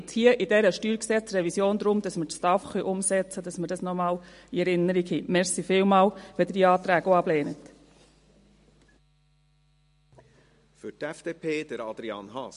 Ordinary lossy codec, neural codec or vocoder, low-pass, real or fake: MP3, 48 kbps; none; 10.8 kHz; real